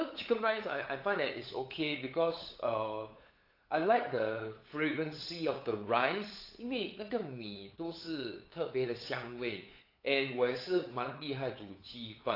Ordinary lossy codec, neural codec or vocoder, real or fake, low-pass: AAC, 32 kbps; codec, 16 kHz, 8 kbps, FunCodec, trained on LibriTTS, 25 frames a second; fake; 5.4 kHz